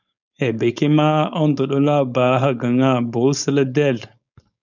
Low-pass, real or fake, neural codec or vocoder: 7.2 kHz; fake; codec, 16 kHz, 4.8 kbps, FACodec